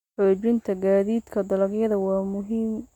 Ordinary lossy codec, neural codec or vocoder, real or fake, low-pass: MP3, 96 kbps; none; real; 19.8 kHz